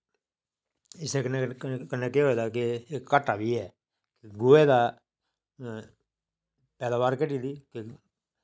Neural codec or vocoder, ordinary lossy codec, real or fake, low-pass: none; none; real; none